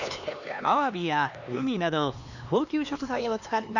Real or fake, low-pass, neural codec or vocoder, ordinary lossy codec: fake; 7.2 kHz; codec, 16 kHz, 2 kbps, X-Codec, HuBERT features, trained on LibriSpeech; none